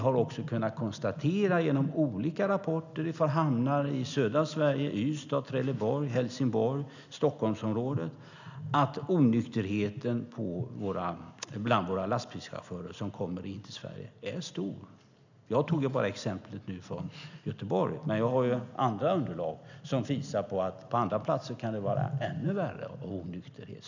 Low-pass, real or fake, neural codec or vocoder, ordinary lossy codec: 7.2 kHz; real; none; none